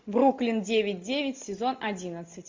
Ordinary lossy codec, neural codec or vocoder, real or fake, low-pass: MP3, 48 kbps; none; real; 7.2 kHz